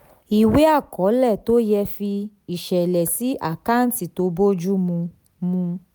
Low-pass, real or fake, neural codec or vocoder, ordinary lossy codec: none; real; none; none